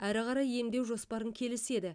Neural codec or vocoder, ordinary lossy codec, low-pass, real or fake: vocoder, 44.1 kHz, 128 mel bands every 256 samples, BigVGAN v2; none; 9.9 kHz; fake